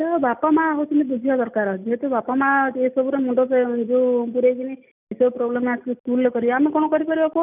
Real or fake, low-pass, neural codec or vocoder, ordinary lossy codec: real; 3.6 kHz; none; none